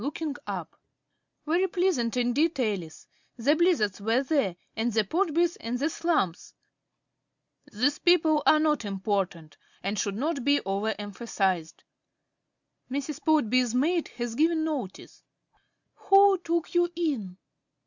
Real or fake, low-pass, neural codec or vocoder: real; 7.2 kHz; none